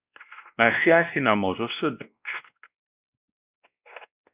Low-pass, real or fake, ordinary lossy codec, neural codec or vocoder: 3.6 kHz; fake; Opus, 64 kbps; codec, 16 kHz, 1 kbps, X-Codec, WavLM features, trained on Multilingual LibriSpeech